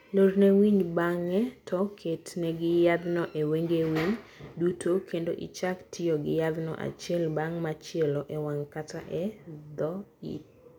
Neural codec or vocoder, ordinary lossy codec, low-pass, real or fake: none; none; 19.8 kHz; real